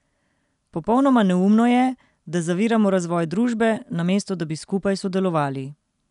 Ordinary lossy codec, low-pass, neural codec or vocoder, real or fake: none; 10.8 kHz; none; real